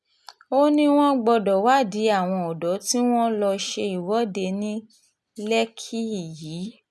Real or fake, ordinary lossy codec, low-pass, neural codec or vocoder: real; none; none; none